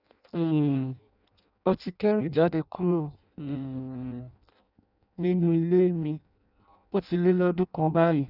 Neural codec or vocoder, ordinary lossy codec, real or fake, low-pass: codec, 16 kHz in and 24 kHz out, 0.6 kbps, FireRedTTS-2 codec; none; fake; 5.4 kHz